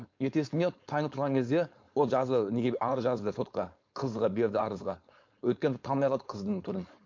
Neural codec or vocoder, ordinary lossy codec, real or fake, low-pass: codec, 16 kHz, 4.8 kbps, FACodec; MP3, 48 kbps; fake; 7.2 kHz